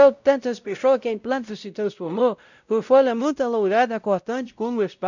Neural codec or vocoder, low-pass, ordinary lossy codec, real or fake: codec, 16 kHz, 0.5 kbps, X-Codec, WavLM features, trained on Multilingual LibriSpeech; 7.2 kHz; none; fake